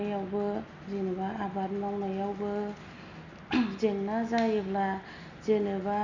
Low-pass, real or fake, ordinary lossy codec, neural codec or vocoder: 7.2 kHz; real; none; none